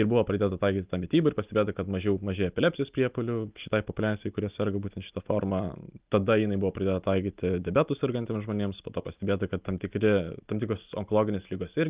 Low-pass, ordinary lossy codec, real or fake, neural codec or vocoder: 3.6 kHz; Opus, 24 kbps; real; none